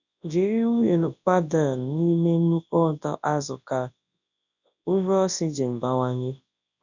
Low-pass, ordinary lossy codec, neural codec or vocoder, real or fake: 7.2 kHz; none; codec, 24 kHz, 0.9 kbps, WavTokenizer, large speech release; fake